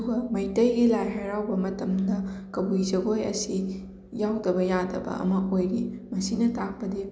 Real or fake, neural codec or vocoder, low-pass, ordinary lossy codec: real; none; none; none